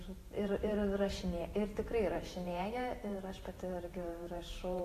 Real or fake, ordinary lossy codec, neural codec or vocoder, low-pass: fake; AAC, 48 kbps; vocoder, 44.1 kHz, 128 mel bands every 512 samples, BigVGAN v2; 14.4 kHz